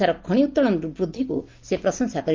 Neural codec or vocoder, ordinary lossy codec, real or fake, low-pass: none; Opus, 24 kbps; real; 7.2 kHz